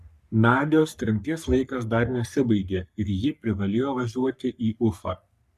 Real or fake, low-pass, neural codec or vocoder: fake; 14.4 kHz; codec, 44.1 kHz, 3.4 kbps, Pupu-Codec